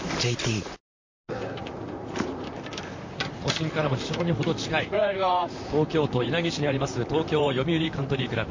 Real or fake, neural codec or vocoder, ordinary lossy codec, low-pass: fake; vocoder, 44.1 kHz, 128 mel bands, Pupu-Vocoder; MP3, 48 kbps; 7.2 kHz